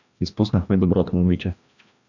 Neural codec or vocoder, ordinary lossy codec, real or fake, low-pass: codec, 16 kHz, 1 kbps, FreqCodec, larger model; AAC, 48 kbps; fake; 7.2 kHz